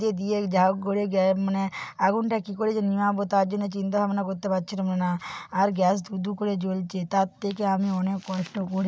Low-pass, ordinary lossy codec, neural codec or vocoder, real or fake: none; none; none; real